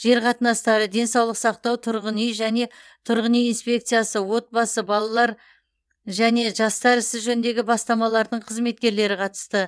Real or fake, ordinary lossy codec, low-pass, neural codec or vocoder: fake; none; none; vocoder, 22.05 kHz, 80 mel bands, WaveNeXt